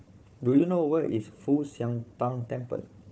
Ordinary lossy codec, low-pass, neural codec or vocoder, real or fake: none; none; codec, 16 kHz, 4 kbps, FunCodec, trained on Chinese and English, 50 frames a second; fake